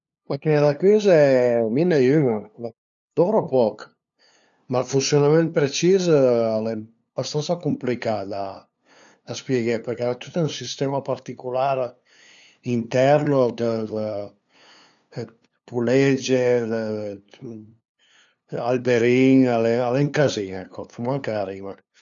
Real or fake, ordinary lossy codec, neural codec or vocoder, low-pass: fake; none; codec, 16 kHz, 2 kbps, FunCodec, trained on LibriTTS, 25 frames a second; 7.2 kHz